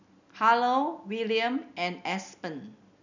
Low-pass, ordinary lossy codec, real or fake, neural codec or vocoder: 7.2 kHz; none; real; none